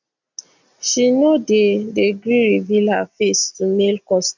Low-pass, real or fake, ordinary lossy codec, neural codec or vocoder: 7.2 kHz; real; none; none